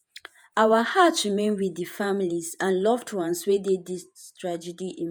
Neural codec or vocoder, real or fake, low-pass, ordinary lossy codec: vocoder, 48 kHz, 128 mel bands, Vocos; fake; none; none